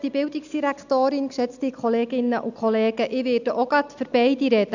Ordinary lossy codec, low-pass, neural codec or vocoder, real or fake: none; 7.2 kHz; none; real